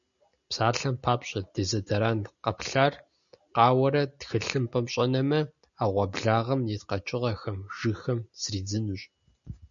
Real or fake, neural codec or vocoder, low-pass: real; none; 7.2 kHz